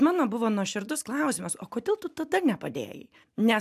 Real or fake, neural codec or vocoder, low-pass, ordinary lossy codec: real; none; 14.4 kHz; AAC, 96 kbps